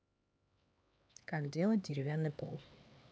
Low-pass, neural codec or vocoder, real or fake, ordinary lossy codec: none; codec, 16 kHz, 4 kbps, X-Codec, HuBERT features, trained on LibriSpeech; fake; none